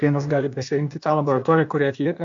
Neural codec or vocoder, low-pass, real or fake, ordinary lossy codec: codec, 16 kHz, 0.8 kbps, ZipCodec; 7.2 kHz; fake; AAC, 64 kbps